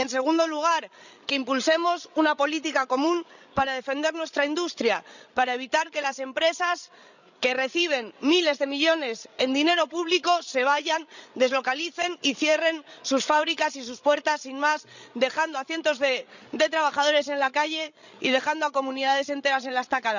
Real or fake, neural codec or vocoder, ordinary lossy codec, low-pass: fake; codec, 16 kHz, 16 kbps, FreqCodec, larger model; none; 7.2 kHz